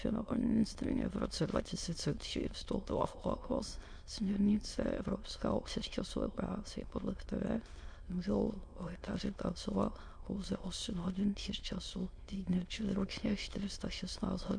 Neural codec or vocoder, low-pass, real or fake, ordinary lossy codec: autoencoder, 22.05 kHz, a latent of 192 numbers a frame, VITS, trained on many speakers; 9.9 kHz; fake; MP3, 64 kbps